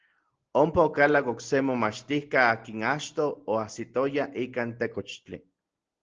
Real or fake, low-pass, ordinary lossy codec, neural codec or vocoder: real; 7.2 kHz; Opus, 16 kbps; none